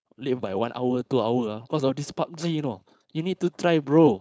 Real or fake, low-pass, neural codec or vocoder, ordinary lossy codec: fake; none; codec, 16 kHz, 4.8 kbps, FACodec; none